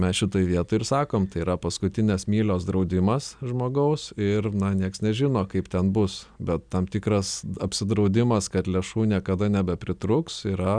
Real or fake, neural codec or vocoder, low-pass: real; none; 9.9 kHz